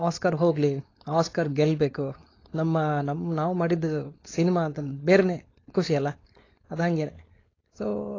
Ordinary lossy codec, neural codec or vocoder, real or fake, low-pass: AAC, 32 kbps; codec, 16 kHz, 4.8 kbps, FACodec; fake; 7.2 kHz